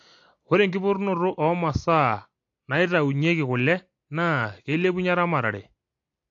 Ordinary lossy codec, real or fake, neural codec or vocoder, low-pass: AAC, 48 kbps; real; none; 7.2 kHz